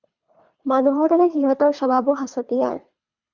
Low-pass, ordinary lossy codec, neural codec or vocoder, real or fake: 7.2 kHz; MP3, 64 kbps; codec, 24 kHz, 3 kbps, HILCodec; fake